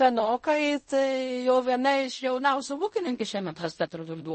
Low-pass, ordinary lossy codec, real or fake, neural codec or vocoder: 10.8 kHz; MP3, 32 kbps; fake; codec, 16 kHz in and 24 kHz out, 0.4 kbps, LongCat-Audio-Codec, fine tuned four codebook decoder